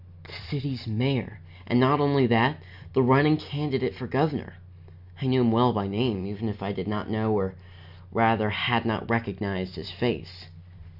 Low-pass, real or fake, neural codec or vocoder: 5.4 kHz; real; none